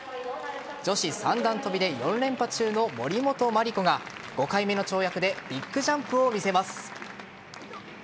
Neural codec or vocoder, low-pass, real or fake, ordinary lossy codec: none; none; real; none